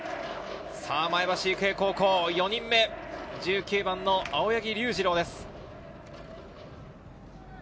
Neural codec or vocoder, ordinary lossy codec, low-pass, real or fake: none; none; none; real